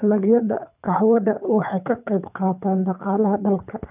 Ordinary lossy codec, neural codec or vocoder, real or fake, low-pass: none; codec, 24 kHz, 3 kbps, HILCodec; fake; 3.6 kHz